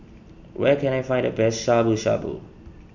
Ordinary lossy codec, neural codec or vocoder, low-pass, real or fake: none; none; 7.2 kHz; real